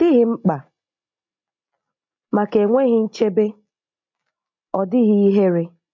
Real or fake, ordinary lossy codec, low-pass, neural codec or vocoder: real; MP3, 48 kbps; 7.2 kHz; none